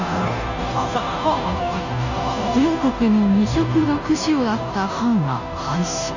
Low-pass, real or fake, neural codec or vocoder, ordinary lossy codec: 7.2 kHz; fake; codec, 16 kHz, 0.5 kbps, FunCodec, trained on Chinese and English, 25 frames a second; none